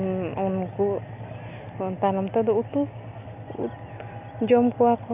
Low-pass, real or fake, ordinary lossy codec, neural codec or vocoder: 3.6 kHz; real; none; none